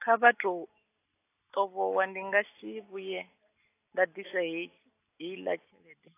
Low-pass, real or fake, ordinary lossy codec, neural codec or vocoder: 3.6 kHz; real; AAC, 24 kbps; none